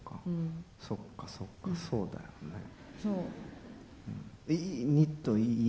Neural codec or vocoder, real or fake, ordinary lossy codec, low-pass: none; real; none; none